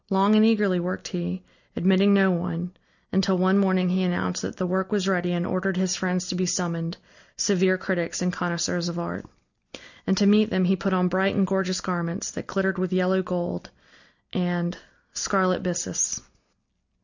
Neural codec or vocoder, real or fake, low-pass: none; real; 7.2 kHz